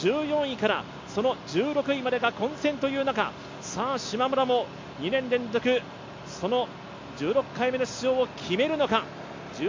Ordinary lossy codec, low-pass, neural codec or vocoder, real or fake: MP3, 48 kbps; 7.2 kHz; none; real